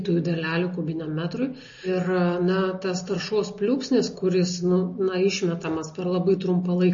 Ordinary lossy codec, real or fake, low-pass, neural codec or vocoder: MP3, 32 kbps; real; 7.2 kHz; none